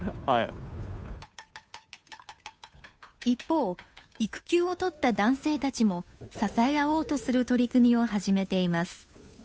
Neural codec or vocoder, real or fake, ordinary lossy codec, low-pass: codec, 16 kHz, 2 kbps, FunCodec, trained on Chinese and English, 25 frames a second; fake; none; none